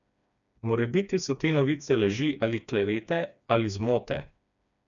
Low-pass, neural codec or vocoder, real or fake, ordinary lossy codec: 7.2 kHz; codec, 16 kHz, 2 kbps, FreqCodec, smaller model; fake; none